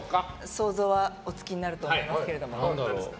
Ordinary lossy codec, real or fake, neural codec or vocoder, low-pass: none; real; none; none